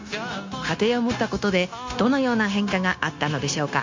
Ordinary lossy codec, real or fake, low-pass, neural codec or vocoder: MP3, 48 kbps; fake; 7.2 kHz; codec, 16 kHz, 0.9 kbps, LongCat-Audio-Codec